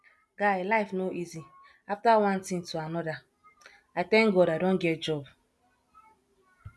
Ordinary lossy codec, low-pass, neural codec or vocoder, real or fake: none; none; none; real